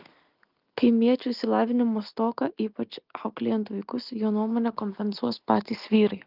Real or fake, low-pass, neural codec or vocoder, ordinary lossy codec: real; 5.4 kHz; none; Opus, 24 kbps